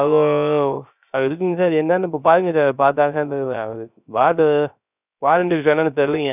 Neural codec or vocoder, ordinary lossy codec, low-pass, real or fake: codec, 16 kHz, 0.3 kbps, FocalCodec; none; 3.6 kHz; fake